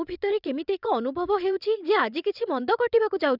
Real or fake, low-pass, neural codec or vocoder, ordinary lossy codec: fake; 5.4 kHz; vocoder, 22.05 kHz, 80 mel bands, WaveNeXt; none